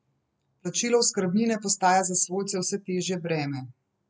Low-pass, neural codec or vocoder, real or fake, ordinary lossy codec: none; none; real; none